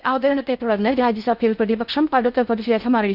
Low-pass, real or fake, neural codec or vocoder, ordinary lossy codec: 5.4 kHz; fake; codec, 16 kHz in and 24 kHz out, 0.6 kbps, FocalCodec, streaming, 2048 codes; none